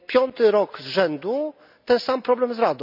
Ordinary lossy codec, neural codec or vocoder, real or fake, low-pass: none; none; real; 5.4 kHz